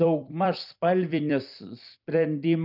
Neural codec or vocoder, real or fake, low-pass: vocoder, 44.1 kHz, 128 mel bands every 256 samples, BigVGAN v2; fake; 5.4 kHz